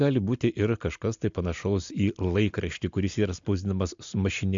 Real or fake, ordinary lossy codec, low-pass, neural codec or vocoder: real; MP3, 64 kbps; 7.2 kHz; none